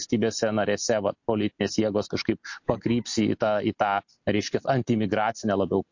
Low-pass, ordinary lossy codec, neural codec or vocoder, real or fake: 7.2 kHz; MP3, 48 kbps; none; real